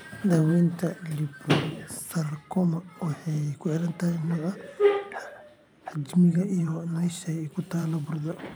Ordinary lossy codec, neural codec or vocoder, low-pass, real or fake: none; vocoder, 44.1 kHz, 128 mel bands every 512 samples, BigVGAN v2; none; fake